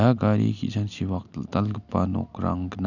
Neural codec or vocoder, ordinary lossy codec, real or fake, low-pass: none; none; real; 7.2 kHz